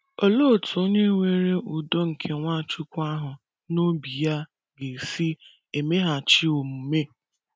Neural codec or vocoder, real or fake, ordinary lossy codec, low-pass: none; real; none; none